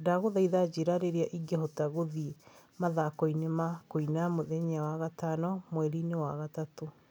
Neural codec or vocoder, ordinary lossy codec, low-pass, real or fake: none; none; none; real